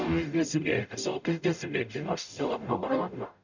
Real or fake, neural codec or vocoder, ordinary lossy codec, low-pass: fake; codec, 44.1 kHz, 0.9 kbps, DAC; none; 7.2 kHz